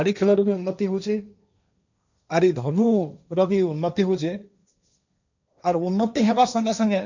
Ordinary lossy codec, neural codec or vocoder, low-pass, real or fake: none; codec, 16 kHz, 1.1 kbps, Voila-Tokenizer; 7.2 kHz; fake